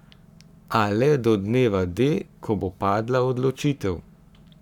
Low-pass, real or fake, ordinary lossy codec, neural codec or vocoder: 19.8 kHz; fake; none; codec, 44.1 kHz, 7.8 kbps, Pupu-Codec